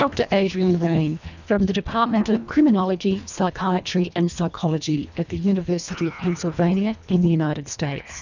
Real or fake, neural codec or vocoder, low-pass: fake; codec, 24 kHz, 1.5 kbps, HILCodec; 7.2 kHz